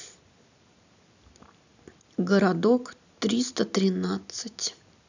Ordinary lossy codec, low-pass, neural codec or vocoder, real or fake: none; 7.2 kHz; none; real